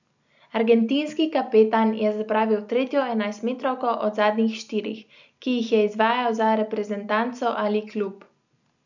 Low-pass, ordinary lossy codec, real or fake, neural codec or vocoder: 7.2 kHz; none; real; none